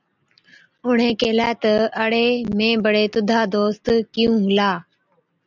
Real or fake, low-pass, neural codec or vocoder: real; 7.2 kHz; none